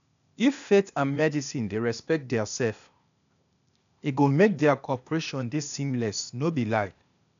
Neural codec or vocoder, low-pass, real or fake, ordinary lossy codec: codec, 16 kHz, 0.8 kbps, ZipCodec; 7.2 kHz; fake; MP3, 96 kbps